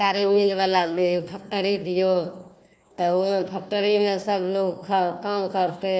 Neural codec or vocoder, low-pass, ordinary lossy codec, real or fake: codec, 16 kHz, 1 kbps, FunCodec, trained on Chinese and English, 50 frames a second; none; none; fake